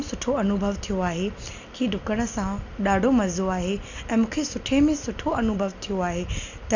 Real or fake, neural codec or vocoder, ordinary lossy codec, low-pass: real; none; none; 7.2 kHz